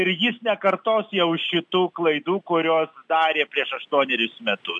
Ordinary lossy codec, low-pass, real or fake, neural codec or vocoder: AAC, 64 kbps; 10.8 kHz; real; none